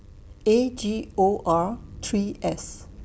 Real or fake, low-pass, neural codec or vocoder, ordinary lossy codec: real; none; none; none